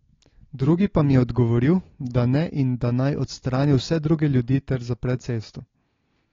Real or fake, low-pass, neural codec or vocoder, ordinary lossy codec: real; 7.2 kHz; none; AAC, 32 kbps